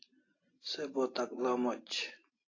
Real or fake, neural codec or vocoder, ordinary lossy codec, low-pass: real; none; AAC, 32 kbps; 7.2 kHz